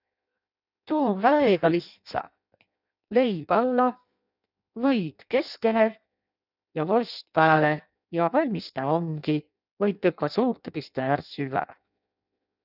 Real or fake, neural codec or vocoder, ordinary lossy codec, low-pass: fake; codec, 16 kHz in and 24 kHz out, 0.6 kbps, FireRedTTS-2 codec; none; 5.4 kHz